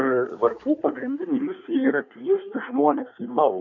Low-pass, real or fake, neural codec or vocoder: 7.2 kHz; fake; codec, 24 kHz, 1 kbps, SNAC